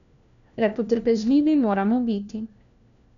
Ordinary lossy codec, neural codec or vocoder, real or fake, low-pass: none; codec, 16 kHz, 1 kbps, FunCodec, trained on LibriTTS, 50 frames a second; fake; 7.2 kHz